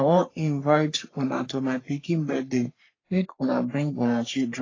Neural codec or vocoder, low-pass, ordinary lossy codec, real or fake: codec, 44.1 kHz, 3.4 kbps, Pupu-Codec; 7.2 kHz; AAC, 32 kbps; fake